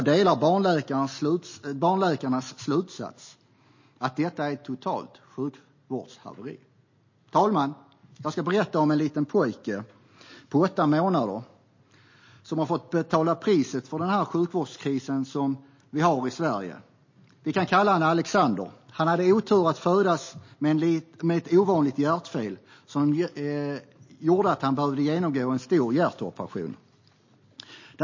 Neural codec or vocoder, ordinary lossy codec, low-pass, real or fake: none; MP3, 32 kbps; 7.2 kHz; real